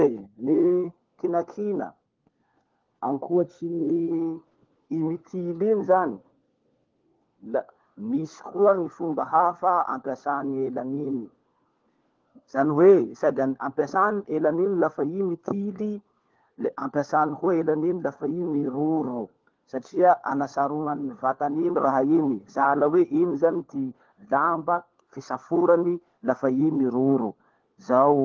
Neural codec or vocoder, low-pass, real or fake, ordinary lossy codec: codec, 16 kHz, 4 kbps, FunCodec, trained on LibriTTS, 50 frames a second; 7.2 kHz; fake; Opus, 16 kbps